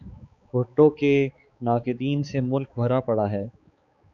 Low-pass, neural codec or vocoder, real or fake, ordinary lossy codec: 7.2 kHz; codec, 16 kHz, 4 kbps, X-Codec, HuBERT features, trained on balanced general audio; fake; MP3, 96 kbps